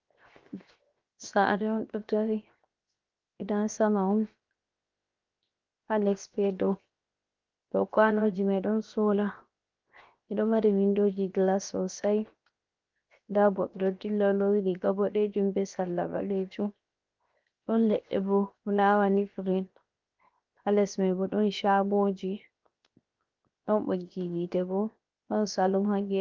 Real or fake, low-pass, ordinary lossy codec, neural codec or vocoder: fake; 7.2 kHz; Opus, 32 kbps; codec, 16 kHz, 0.7 kbps, FocalCodec